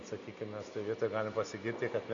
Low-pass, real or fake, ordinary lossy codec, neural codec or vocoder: 7.2 kHz; real; Opus, 64 kbps; none